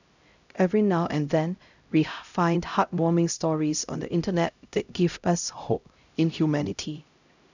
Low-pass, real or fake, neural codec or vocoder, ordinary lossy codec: 7.2 kHz; fake; codec, 16 kHz, 0.5 kbps, X-Codec, HuBERT features, trained on LibriSpeech; none